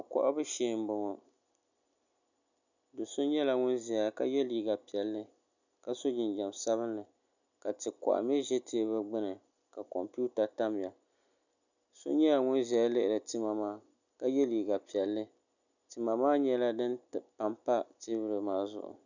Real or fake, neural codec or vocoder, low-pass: real; none; 7.2 kHz